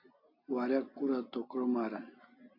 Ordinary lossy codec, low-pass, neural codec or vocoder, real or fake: MP3, 32 kbps; 5.4 kHz; none; real